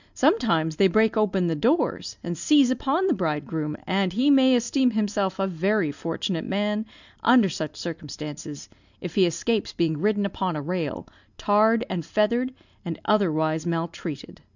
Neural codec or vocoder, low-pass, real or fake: none; 7.2 kHz; real